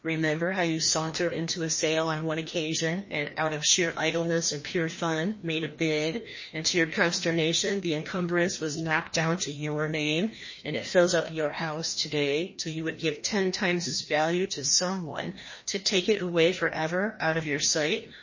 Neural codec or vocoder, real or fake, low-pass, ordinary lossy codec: codec, 16 kHz, 1 kbps, FreqCodec, larger model; fake; 7.2 kHz; MP3, 32 kbps